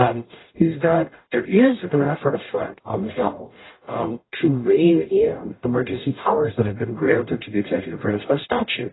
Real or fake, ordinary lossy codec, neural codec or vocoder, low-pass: fake; AAC, 16 kbps; codec, 44.1 kHz, 0.9 kbps, DAC; 7.2 kHz